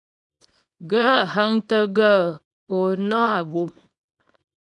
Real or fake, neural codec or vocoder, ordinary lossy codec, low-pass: fake; codec, 24 kHz, 0.9 kbps, WavTokenizer, small release; MP3, 96 kbps; 10.8 kHz